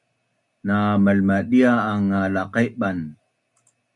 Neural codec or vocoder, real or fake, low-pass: none; real; 10.8 kHz